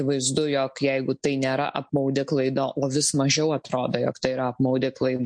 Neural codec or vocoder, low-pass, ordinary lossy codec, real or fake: none; 9.9 kHz; MP3, 48 kbps; real